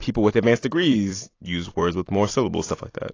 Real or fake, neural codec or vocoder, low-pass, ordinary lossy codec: fake; vocoder, 44.1 kHz, 128 mel bands every 256 samples, BigVGAN v2; 7.2 kHz; AAC, 32 kbps